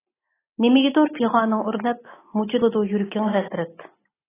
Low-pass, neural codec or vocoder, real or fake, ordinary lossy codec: 3.6 kHz; none; real; AAC, 16 kbps